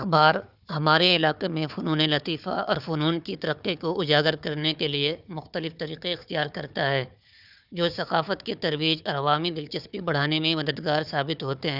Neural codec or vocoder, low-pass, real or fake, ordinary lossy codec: codec, 16 kHz, 4 kbps, FunCodec, trained on Chinese and English, 50 frames a second; 5.4 kHz; fake; none